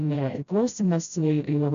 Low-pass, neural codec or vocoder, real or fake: 7.2 kHz; codec, 16 kHz, 0.5 kbps, FreqCodec, smaller model; fake